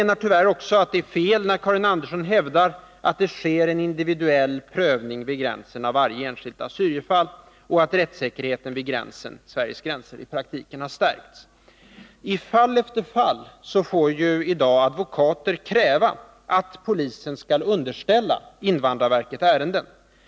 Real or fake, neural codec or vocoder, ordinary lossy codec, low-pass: real; none; none; none